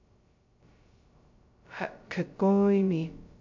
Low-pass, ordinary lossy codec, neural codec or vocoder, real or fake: 7.2 kHz; MP3, 48 kbps; codec, 16 kHz, 0.2 kbps, FocalCodec; fake